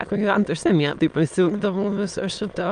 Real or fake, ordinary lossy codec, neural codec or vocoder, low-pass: fake; Opus, 32 kbps; autoencoder, 22.05 kHz, a latent of 192 numbers a frame, VITS, trained on many speakers; 9.9 kHz